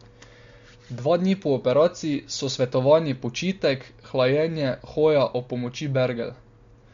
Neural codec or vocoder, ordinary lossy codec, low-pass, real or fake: none; MP3, 48 kbps; 7.2 kHz; real